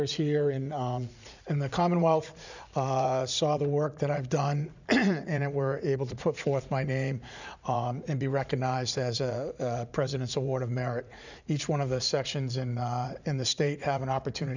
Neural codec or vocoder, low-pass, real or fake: vocoder, 44.1 kHz, 80 mel bands, Vocos; 7.2 kHz; fake